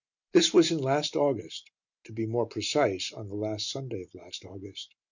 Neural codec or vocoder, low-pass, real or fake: none; 7.2 kHz; real